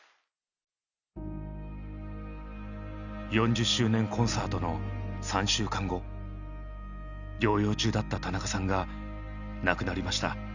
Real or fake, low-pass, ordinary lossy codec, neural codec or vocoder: real; 7.2 kHz; none; none